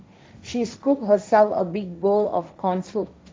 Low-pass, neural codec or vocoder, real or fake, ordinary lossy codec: none; codec, 16 kHz, 1.1 kbps, Voila-Tokenizer; fake; none